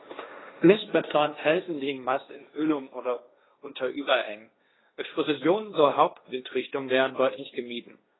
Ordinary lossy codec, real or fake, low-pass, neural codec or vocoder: AAC, 16 kbps; fake; 7.2 kHz; codec, 16 kHz, 1.1 kbps, Voila-Tokenizer